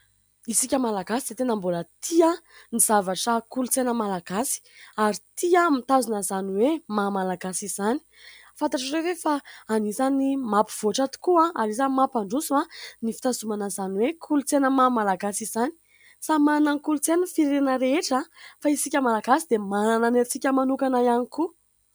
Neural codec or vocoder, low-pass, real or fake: none; 19.8 kHz; real